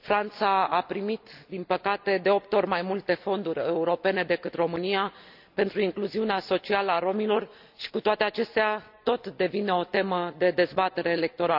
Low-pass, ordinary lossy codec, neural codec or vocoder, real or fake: 5.4 kHz; none; none; real